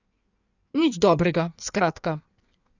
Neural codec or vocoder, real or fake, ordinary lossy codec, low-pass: codec, 16 kHz in and 24 kHz out, 1.1 kbps, FireRedTTS-2 codec; fake; none; 7.2 kHz